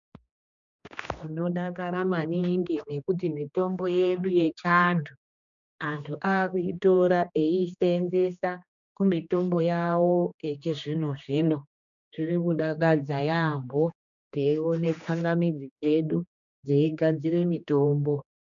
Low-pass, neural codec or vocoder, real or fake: 7.2 kHz; codec, 16 kHz, 2 kbps, X-Codec, HuBERT features, trained on general audio; fake